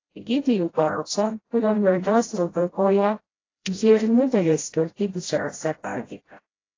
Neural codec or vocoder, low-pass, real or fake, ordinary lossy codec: codec, 16 kHz, 0.5 kbps, FreqCodec, smaller model; 7.2 kHz; fake; AAC, 32 kbps